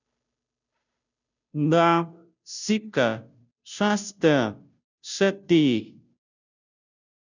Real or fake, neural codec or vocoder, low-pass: fake; codec, 16 kHz, 0.5 kbps, FunCodec, trained on Chinese and English, 25 frames a second; 7.2 kHz